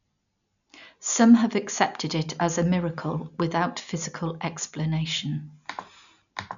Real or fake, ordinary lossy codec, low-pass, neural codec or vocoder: real; none; 7.2 kHz; none